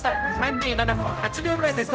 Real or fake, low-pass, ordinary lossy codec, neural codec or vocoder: fake; none; none; codec, 16 kHz, 0.5 kbps, X-Codec, HuBERT features, trained on general audio